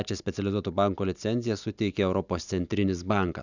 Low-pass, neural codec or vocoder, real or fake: 7.2 kHz; none; real